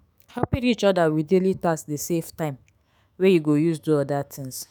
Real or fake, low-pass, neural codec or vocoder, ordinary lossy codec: fake; none; autoencoder, 48 kHz, 128 numbers a frame, DAC-VAE, trained on Japanese speech; none